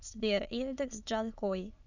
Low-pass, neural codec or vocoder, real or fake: 7.2 kHz; autoencoder, 22.05 kHz, a latent of 192 numbers a frame, VITS, trained on many speakers; fake